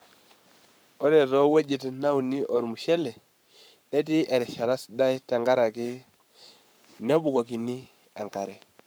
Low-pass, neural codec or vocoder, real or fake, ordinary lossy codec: none; codec, 44.1 kHz, 7.8 kbps, Pupu-Codec; fake; none